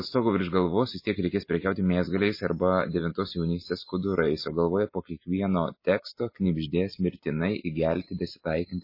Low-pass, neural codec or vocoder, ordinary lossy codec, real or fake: 5.4 kHz; none; MP3, 24 kbps; real